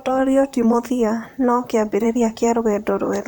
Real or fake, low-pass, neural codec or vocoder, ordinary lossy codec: fake; none; vocoder, 44.1 kHz, 128 mel bands, Pupu-Vocoder; none